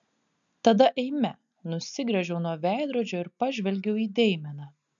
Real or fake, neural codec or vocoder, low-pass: real; none; 7.2 kHz